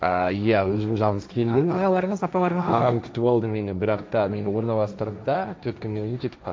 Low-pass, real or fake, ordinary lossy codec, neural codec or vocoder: none; fake; none; codec, 16 kHz, 1.1 kbps, Voila-Tokenizer